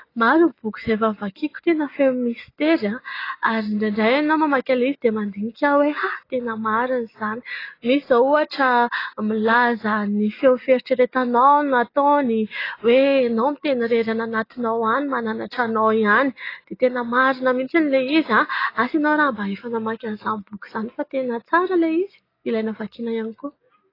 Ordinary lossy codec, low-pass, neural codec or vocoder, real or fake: AAC, 24 kbps; 5.4 kHz; none; real